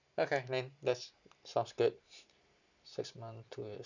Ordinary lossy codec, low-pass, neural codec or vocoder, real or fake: none; 7.2 kHz; none; real